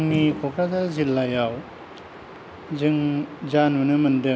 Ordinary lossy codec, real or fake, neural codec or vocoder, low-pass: none; real; none; none